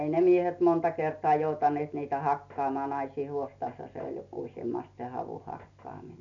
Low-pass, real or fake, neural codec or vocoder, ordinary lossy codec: 7.2 kHz; real; none; none